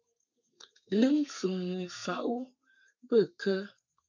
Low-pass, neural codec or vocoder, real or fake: 7.2 kHz; codec, 32 kHz, 1.9 kbps, SNAC; fake